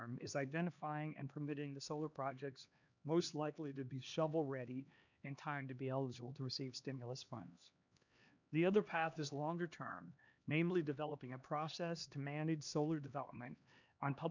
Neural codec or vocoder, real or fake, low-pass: codec, 16 kHz, 2 kbps, X-Codec, HuBERT features, trained on LibriSpeech; fake; 7.2 kHz